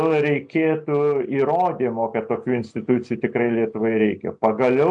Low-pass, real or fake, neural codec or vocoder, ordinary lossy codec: 9.9 kHz; real; none; AAC, 64 kbps